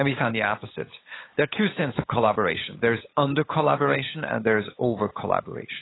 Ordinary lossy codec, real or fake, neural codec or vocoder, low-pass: AAC, 16 kbps; fake; codec, 16 kHz, 8 kbps, FunCodec, trained on LibriTTS, 25 frames a second; 7.2 kHz